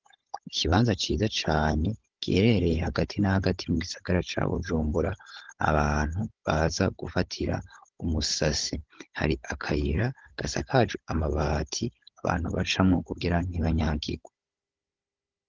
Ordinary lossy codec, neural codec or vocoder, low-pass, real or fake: Opus, 16 kbps; codec, 16 kHz, 16 kbps, FunCodec, trained on Chinese and English, 50 frames a second; 7.2 kHz; fake